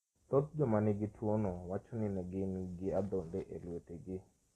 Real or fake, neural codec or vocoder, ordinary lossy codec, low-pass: real; none; AAC, 32 kbps; 14.4 kHz